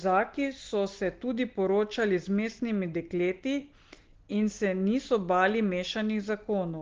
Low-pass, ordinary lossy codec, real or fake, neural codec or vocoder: 7.2 kHz; Opus, 16 kbps; real; none